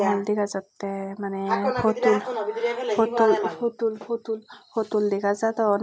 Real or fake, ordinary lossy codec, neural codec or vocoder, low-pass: real; none; none; none